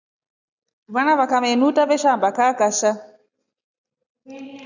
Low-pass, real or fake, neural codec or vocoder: 7.2 kHz; real; none